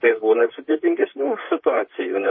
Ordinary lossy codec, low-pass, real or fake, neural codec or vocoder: MP3, 24 kbps; 7.2 kHz; fake; vocoder, 44.1 kHz, 128 mel bands, Pupu-Vocoder